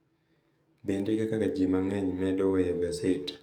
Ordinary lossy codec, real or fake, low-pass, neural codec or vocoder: none; fake; 19.8 kHz; codec, 44.1 kHz, 7.8 kbps, DAC